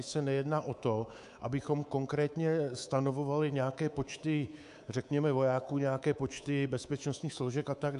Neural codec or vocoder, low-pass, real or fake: codec, 24 kHz, 3.1 kbps, DualCodec; 10.8 kHz; fake